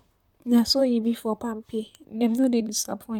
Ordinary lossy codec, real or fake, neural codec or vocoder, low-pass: none; fake; vocoder, 44.1 kHz, 128 mel bands, Pupu-Vocoder; 19.8 kHz